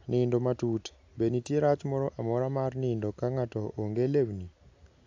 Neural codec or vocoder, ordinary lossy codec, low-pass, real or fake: none; AAC, 48 kbps; 7.2 kHz; real